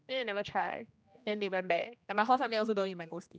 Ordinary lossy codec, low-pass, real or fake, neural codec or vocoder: none; none; fake; codec, 16 kHz, 1 kbps, X-Codec, HuBERT features, trained on general audio